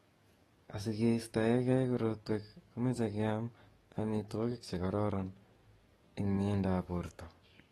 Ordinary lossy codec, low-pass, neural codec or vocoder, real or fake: AAC, 32 kbps; 19.8 kHz; codec, 44.1 kHz, 7.8 kbps, DAC; fake